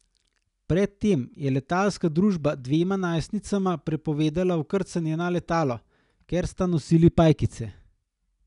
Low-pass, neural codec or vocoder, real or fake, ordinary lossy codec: 10.8 kHz; none; real; none